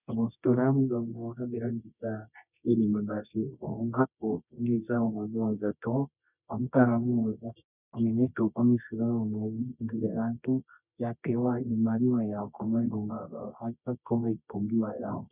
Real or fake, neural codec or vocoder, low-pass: fake; codec, 24 kHz, 0.9 kbps, WavTokenizer, medium music audio release; 3.6 kHz